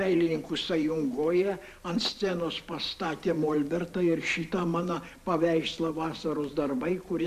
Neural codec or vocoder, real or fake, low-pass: vocoder, 44.1 kHz, 128 mel bands every 512 samples, BigVGAN v2; fake; 14.4 kHz